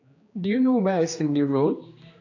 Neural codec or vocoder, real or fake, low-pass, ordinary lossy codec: codec, 16 kHz, 2 kbps, X-Codec, HuBERT features, trained on general audio; fake; 7.2 kHz; AAC, 48 kbps